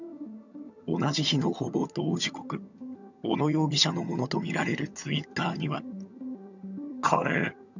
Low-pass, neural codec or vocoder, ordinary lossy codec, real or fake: 7.2 kHz; vocoder, 22.05 kHz, 80 mel bands, HiFi-GAN; none; fake